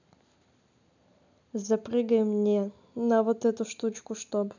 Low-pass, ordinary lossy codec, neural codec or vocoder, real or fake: 7.2 kHz; none; none; real